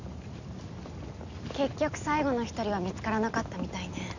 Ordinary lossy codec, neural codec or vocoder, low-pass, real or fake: none; none; 7.2 kHz; real